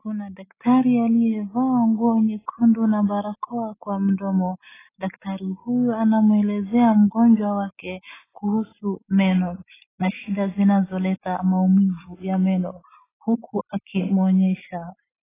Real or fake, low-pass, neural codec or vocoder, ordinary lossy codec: real; 3.6 kHz; none; AAC, 16 kbps